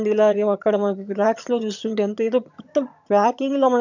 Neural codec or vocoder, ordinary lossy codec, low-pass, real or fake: vocoder, 22.05 kHz, 80 mel bands, HiFi-GAN; none; 7.2 kHz; fake